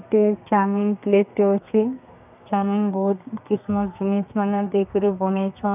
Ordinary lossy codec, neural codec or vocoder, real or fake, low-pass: none; codec, 32 kHz, 1.9 kbps, SNAC; fake; 3.6 kHz